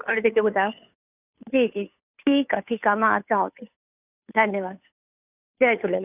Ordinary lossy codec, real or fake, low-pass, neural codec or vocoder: none; fake; 3.6 kHz; codec, 16 kHz, 2 kbps, FunCodec, trained on Chinese and English, 25 frames a second